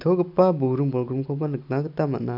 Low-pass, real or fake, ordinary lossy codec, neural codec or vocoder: 5.4 kHz; real; none; none